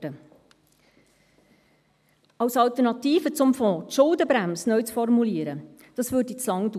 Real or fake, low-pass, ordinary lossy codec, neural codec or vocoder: real; 14.4 kHz; none; none